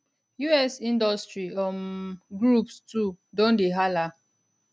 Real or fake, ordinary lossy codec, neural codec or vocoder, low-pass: real; none; none; none